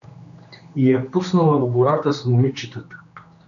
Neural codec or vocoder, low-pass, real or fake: codec, 16 kHz, 2 kbps, X-Codec, HuBERT features, trained on general audio; 7.2 kHz; fake